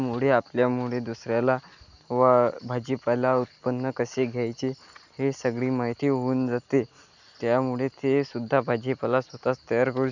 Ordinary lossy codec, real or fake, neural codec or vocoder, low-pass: none; real; none; 7.2 kHz